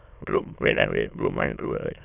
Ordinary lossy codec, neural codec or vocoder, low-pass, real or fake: none; autoencoder, 22.05 kHz, a latent of 192 numbers a frame, VITS, trained on many speakers; 3.6 kHz; fake